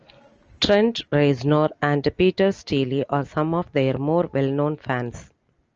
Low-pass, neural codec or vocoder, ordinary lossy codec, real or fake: 7.2 kHz; none; Opus, 24 kbps; real